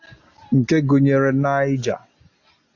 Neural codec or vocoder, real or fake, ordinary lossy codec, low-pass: none; real; AAC, 48 kbps; 7.2 kHz